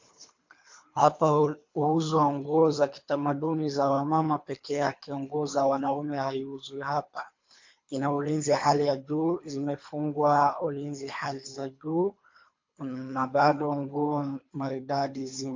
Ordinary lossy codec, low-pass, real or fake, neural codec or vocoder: MP3, 48 kbps; 7.2 kHz; fake; codec, 24 kHz, 3 kbps, HILCodec